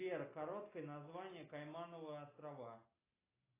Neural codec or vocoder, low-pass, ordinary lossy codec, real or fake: none; 3.6 kHz; AAC, 16 kbps; real